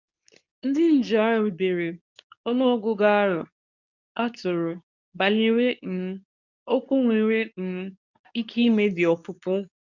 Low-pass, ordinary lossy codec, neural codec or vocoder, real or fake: 7.2 kHz; none; codec, 24 kHz, 0.9 kbps, WavTokenizer, medium speech release version 2; fake